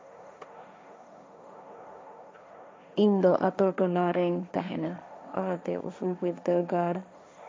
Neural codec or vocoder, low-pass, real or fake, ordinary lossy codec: codec, 16 kHz, 1.1 kbps, Voila-Tokenizer; none; fake; none